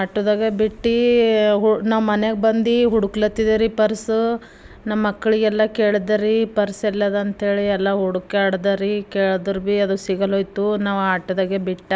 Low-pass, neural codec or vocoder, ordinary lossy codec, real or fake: none; none; none; real